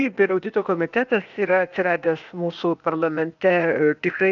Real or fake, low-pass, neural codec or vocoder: fake; 7.2 kHz; codec, 16 kHz, 0.8 kbps, ZipCodec